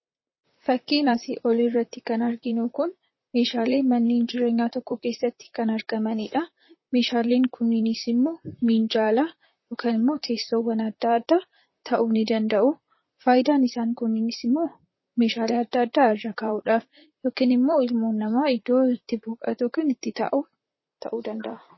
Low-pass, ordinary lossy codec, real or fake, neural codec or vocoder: 7.2 kHz; MP3, 24 kbps; fake; vocoder, 44.1 kHz, 128 mel bands, Pupu-Vocoder